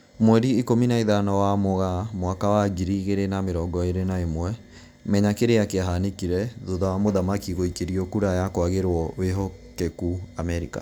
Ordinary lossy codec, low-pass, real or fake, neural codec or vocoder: none; none; real; none